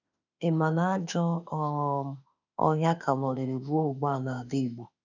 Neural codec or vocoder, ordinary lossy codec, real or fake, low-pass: autoencoder, 48 kHz, 32 numbers a frame, DAC-VAE, trained on Japanese speech; none; fake; 7.2 kHz